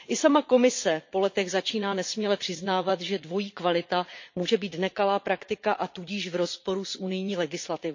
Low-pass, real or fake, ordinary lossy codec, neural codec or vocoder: 7.2 kHz; fake; MP3, 48 kbps; vocoder, 44.1 kHz, 80 mel bands, Vocos